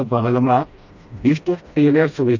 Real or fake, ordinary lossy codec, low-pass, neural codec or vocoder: fake; MP3, 48 kbps; 7.2 kHz; codec, 16 kHz, 1 kbps, FreqCodec, smaller model